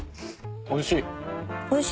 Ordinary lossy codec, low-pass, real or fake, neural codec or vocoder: none; none; real; none